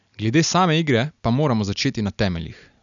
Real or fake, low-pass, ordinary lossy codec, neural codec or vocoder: real; 7.2 kHz; none; none